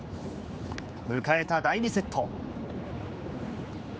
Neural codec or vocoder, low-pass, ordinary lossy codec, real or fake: codec, 16 kHz, 4 kbps, X-Codec, HuBERT features, trained on general audio; none; none; fake